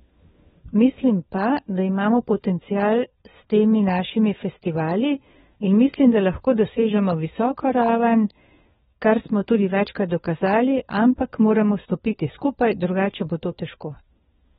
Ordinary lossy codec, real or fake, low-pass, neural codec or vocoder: AAC, 16 kbps; fake; 7.2 kHz; codec, 16 kHz, 4 kbps, FunCodec, trained on LibriTTS, 50 frames a second